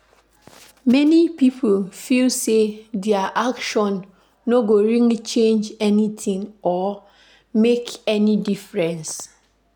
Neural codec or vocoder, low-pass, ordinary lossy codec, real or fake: none; 19.8 kHz; none; real